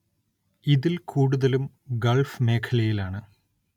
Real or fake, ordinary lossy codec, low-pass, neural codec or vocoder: real; none; 19.8 kHz; none